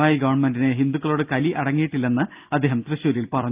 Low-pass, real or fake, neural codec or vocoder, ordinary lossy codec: 3.6 kHz; real; none; Opus, 24 kbps